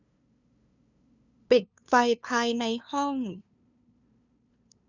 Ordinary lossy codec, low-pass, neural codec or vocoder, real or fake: none; 7.2 kHz; codec, 16 kHz, 2 kbps, FunCodec, trained on LibriTTS, 25 frames a second; fake